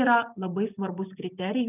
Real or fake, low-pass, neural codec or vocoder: real; 3.6 kHz; none